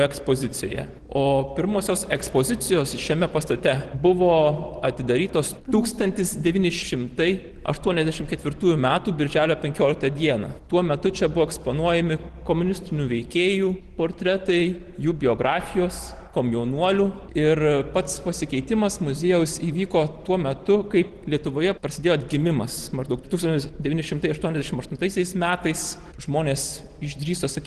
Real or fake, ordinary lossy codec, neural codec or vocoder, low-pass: real; Opus, 16 kbps; none; 10.8 kHz